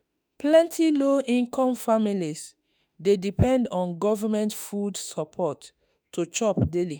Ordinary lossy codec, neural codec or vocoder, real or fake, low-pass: none; autoencoder, 48 kHz, 32 numbers a frame, DAC-VAE, trained on Japanese speech; fake; none